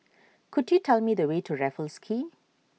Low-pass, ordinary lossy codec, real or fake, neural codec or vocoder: none; none; real; none